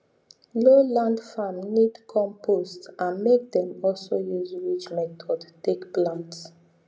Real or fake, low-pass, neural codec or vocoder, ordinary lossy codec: real; none; none; none